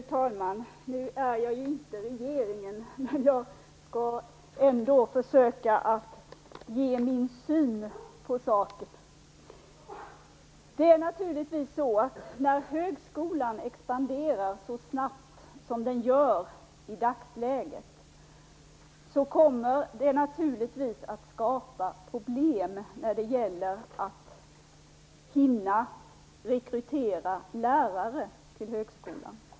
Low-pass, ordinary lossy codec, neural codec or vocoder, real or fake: none; none; none; real